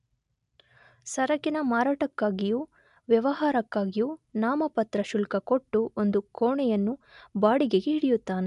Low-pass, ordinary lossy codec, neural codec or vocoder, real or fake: 10.8 kHz; none; none; real